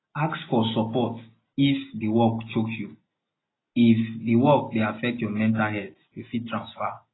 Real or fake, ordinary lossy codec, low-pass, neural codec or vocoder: real; AAC, 16 kbps; 7.2 kHz; none